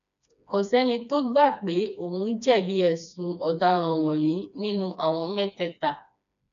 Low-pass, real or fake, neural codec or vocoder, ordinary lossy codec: 7.2 kHz; fake; codec, 16 kHz, 2 kbps, FreqCodec, smaller model; none